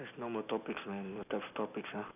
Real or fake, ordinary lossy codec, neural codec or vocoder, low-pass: real; none; none; 3.6 kHz